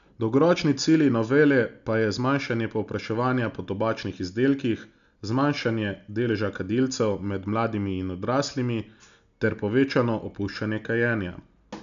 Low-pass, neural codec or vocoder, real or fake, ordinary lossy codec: 7.2 kHz; none; real; none